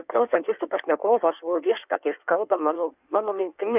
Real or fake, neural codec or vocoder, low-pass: fake; codec, 16 kHz in and 24 kHz out, 1.1 kbps, FireRedTTS-2 codec; 3.6 kHz